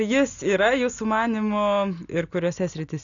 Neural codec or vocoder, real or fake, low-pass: none; real; 7.2 kHz